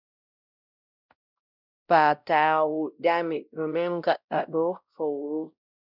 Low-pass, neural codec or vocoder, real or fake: 5.4 kHz; codec, 16 kHz, 0.5 kbps, X-Codec, WavLM features, trained on Multilingual LibriSpeech; fake